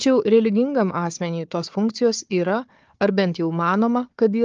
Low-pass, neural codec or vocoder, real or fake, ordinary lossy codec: 7.2 kHz; codec, 16 kHz, 4 kbps, FunCodec, trained on Chinese and English, 50 frames a second; fake; Opus, 24 kbps